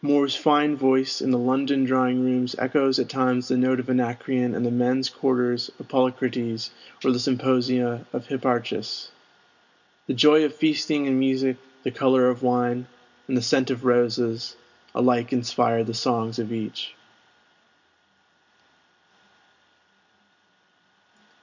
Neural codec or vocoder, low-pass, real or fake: none; 7.2 kHz; real